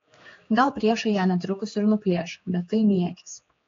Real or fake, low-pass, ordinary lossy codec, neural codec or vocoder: fake; 7.2 kHz; AAC, 32 kbps; codec, 16 kHz, 4 kbps, X-Codec, HuBERT features, trained on general audio